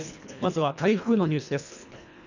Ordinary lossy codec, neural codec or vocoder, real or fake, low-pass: none; codec, 24 kHz, 1.5 kbps, HILCodec; fake; 7.2 kHz